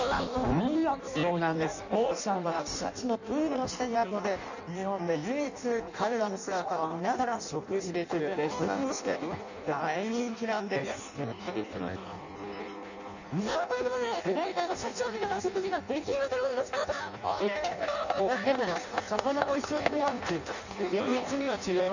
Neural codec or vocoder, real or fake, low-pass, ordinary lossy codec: codec, 16 kHz in and 24 kHz out, 0.6 kbps, FireRedTTS-2 codec; fake; 7.2 kHz; none